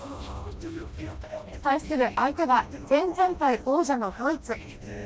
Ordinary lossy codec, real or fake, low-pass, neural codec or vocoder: none; fake; none; codec, 16 kHz, 1 kbps, FreqCodec, smaller model